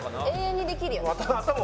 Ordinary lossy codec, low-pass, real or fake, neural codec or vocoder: none; none; real; none